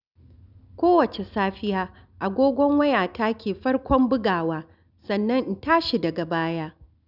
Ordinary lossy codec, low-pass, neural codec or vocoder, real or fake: none; 5.4 kHz; none; real